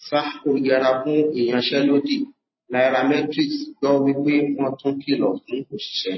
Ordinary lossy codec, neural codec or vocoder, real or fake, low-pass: MP3, 24 kbps; vocoder, 44.1 kHz, 128 mel bands every 512 samples, BigVGAN v2; fake; 7.2 kHz